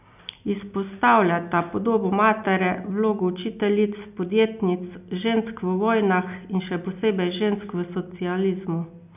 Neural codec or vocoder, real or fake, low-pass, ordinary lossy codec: none; real; 3.6 kHz; none